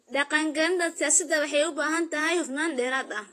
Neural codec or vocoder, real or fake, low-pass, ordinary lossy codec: vocoder, 48 kHz, 128 mel bands, Vocos; fake; 14.4 kHz; AAC, 48 kbps